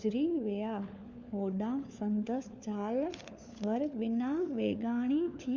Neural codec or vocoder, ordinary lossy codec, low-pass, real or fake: codec, 16 kHz, 4 kbps, FunCodec, trained on LibriTTS, 50 frames a second; MP3, 64 kbps; 7.2 kHz; fake